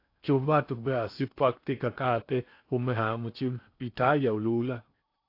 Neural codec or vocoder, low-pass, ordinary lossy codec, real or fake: codec, 16 kHz in and 24 kHz out, 0.8 kbps, FocalCodec, streaming, 65536 codes; 5.4 kHz; AAC, 32 kbps; fake